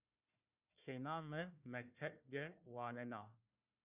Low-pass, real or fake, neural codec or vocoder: 3.6 kHz; fake; codec, 16 kHz, 1 kbps, FunCodec, trained on Chinese and English, 50 frames a second